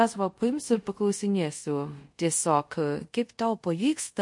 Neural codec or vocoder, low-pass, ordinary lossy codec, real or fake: codec, 24 kHz, 0.5 kbps, DualCodec; 10.8 kHz; MP3, 48 kbps; fake